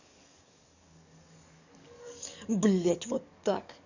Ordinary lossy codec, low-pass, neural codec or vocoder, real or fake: none; 7.2 kHz; codec, 44.1 kHz, 7.8 kbps, DAC; fake